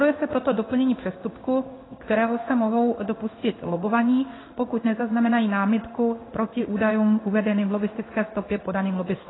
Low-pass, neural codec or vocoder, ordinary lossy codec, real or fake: 7.2 kHz; codec, 16 kHz in and 24 kHz out, 1 kbps, XY-Tokenizer; AAC, 16 kbps; fake